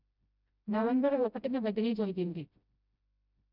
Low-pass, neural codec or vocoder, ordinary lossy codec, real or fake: 5.4 kHz; codec, 16 kHz, 0.5 kbps, FreqCodec, smaller model; none; fake